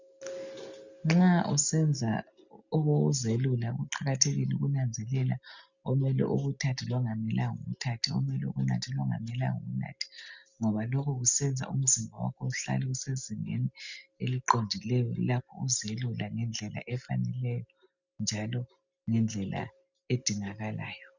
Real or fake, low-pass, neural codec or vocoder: real; 7.2 kHz; none